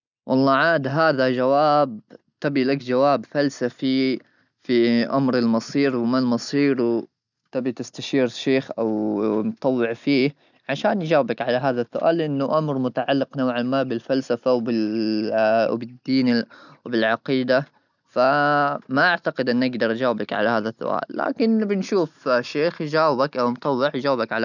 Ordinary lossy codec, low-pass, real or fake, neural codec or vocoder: none; 7.2 kHz; real; none